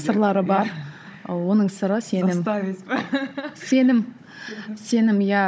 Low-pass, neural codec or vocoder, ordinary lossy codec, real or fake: none; codec, 16 kHz, 16 kbps, FunCodec, trained on Chinese and English, 50 frames a second; none; fake